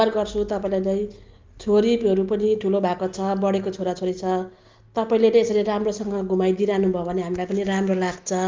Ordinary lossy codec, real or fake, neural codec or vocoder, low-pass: Opus, 32 kbps; real; none; 7.2 kHz